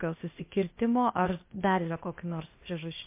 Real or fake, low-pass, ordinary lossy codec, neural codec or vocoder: fake; 3.6 kHz; MP3, 24 kbps; codec, 16 kHz, 0.8 kbps, ZipCodec